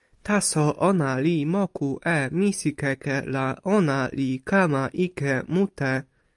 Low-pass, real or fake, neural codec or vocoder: 10.8 kHz; real; none